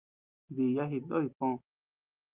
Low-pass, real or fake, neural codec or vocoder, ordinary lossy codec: 3.6 kHz; real; none; Opus, 24 kbps